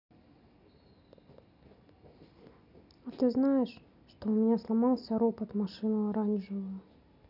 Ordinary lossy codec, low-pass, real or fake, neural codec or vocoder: none; 5.4 kHz; real; none